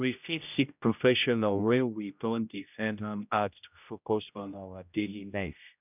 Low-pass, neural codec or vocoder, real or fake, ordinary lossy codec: 3.6 kHz; codec, 16 kHz, 0.5 kbps, X-Codec, HuBERT features, trained on general audio; fake; none